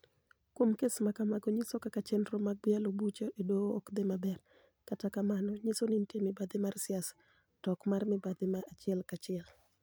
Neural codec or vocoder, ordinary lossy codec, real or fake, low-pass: none; none; real; none